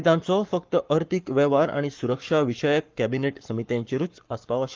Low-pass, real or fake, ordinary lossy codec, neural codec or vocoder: 7.2 kHz; fake; Opus, 32 kbps; codec, 44.1 kHz, 7.8 kbps, Pupu-Codec